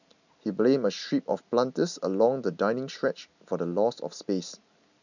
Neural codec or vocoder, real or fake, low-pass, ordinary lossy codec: none; real; 7.2 kHz; none